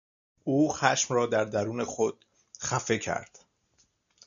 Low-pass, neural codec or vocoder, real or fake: 7.2 kHz; none; real